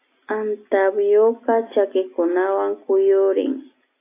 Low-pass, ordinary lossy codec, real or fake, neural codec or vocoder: 3.6 kHz; AAC, 24 kbps; real; none